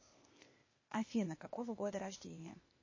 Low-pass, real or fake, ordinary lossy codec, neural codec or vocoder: 7.2 kHz; fake; MP3, 32 kbps; codec, 16 kHz, 0.8 kbps, ZipCodec